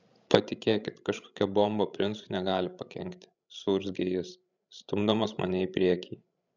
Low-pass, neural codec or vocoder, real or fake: 7.2 kHz; codec, 16 kHz, 16 kbps, FreqCodec, larger model; fake